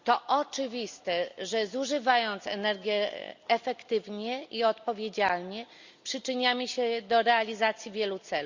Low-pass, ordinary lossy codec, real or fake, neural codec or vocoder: 7.2 kHz; Opus, 64 kbps; real; none